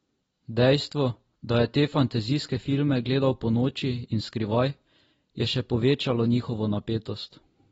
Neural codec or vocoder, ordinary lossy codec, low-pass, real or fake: none; AAC, 24 kbps; 9.9 kHz; real